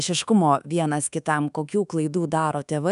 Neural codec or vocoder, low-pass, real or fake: codec, 24 kHz, 1.2 kbps, DualCodec; 10.8 kHz; fake